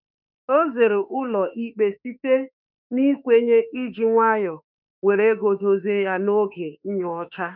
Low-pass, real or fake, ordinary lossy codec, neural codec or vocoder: 5.4 kHz; fake; none; autoencoder, 48 kHz, 32 numbers a frame, DAC-VAE, trained on Japanese speech